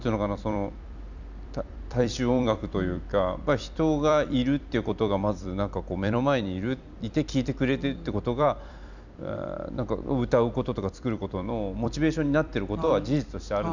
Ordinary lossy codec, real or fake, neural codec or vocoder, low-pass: none; fake; vocoder, 44.1 kHz, 128 mel bands every 256 samples, BigVGAN v2; 7.2 kHz